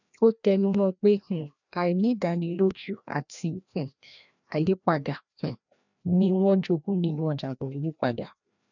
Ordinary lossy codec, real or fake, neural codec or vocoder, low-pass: none; fake; codec, 16 kHz, 1 kbps, FreqCodec, larger model; 7.2 kHz